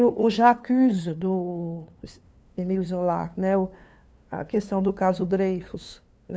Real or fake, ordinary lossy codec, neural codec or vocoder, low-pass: fake; none; codec, 16 kHz, 2 kbps, FunCodec, trained on LibriTTS, 25 frames a second; none